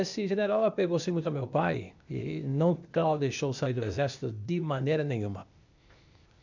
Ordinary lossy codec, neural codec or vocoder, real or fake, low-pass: none; codec, 16 kHz, 0.8 kbps, ZipCodec; fake; 7.2 kHz